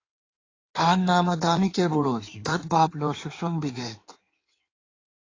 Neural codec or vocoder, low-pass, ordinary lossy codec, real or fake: codec, 16 kHz in and 24 kHz out, 1.1 kbps, FireRedTTS-2 codec; 7.2 kHz; AAC, 32 kbps; fake